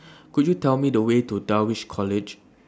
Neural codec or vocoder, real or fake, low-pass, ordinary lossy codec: none; real; none; none